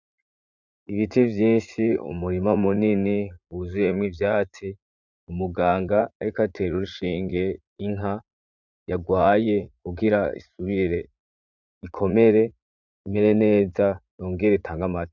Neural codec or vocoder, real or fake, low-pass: vocoder, 44.1 kHz, 80 mel bands, Vocos; fake; 7.2 kHz